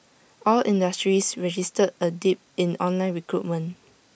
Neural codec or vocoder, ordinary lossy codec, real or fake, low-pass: none; none; real; none